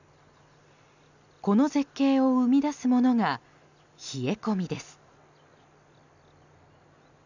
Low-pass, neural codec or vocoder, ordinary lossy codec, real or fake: 7.2 kHz; none; none; real